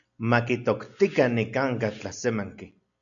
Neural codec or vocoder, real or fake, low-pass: none; real; 7.2 kHz